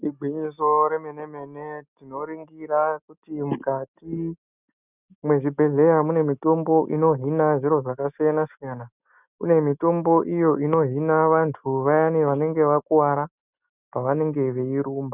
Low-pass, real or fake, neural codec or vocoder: 3.6 kHz; real; none